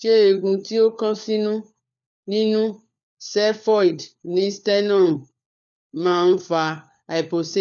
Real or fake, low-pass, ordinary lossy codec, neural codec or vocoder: fake; 7.2 kHz; none; codec, 16 kHz, 4 kbps, FunCodec, trained on LibriTTS, 50 frames a second